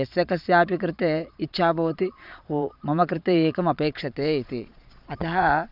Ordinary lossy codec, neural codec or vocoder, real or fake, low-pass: none; vocoder, 22.05 kHz, 80 mel bands, Vocos; fake; 5.4 kHz